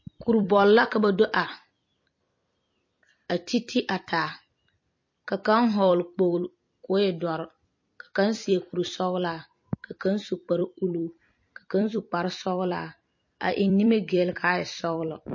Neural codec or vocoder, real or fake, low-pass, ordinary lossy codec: vocoder, 44.1 kHz, 128 mel bands every 256 samples, BigVGAN v2; fake; 7.2 kHz; MP3, 32 kbps